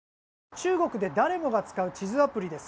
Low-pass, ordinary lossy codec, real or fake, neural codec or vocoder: none; none; real; none